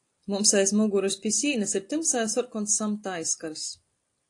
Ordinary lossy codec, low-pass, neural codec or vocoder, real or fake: AAC, 48 kbps; 10.8 kHz; none; real